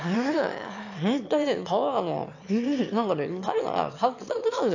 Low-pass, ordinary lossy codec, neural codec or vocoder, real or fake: 7.2 kHz; AAC, 48 kbps; autoencoder, 22.05 kHz, a latent of 192 numbers a frame, VITS, trained on one speaker; fake